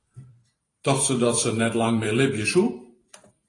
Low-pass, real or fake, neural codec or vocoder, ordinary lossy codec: 10.8 kHz; fake; vocoder, 44.1 kHz, 128 mel bands every 512 samples, BigVGAN v2; AAC, 32 kbps